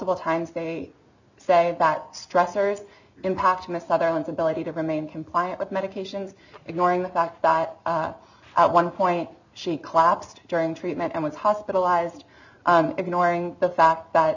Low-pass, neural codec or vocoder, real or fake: 7.2 kHz; none; real